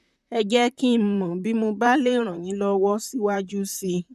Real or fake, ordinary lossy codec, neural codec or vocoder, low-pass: fake; none; vocoder, 44.1 kHz, 128 mel bands, Pupu-Vocoder; 14.4 kHz